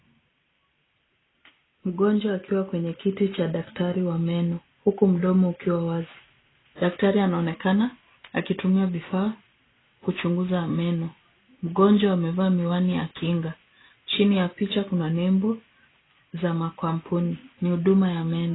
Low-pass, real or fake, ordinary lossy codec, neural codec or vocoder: 7.2 kHz; real; AAC, 16 kbps; none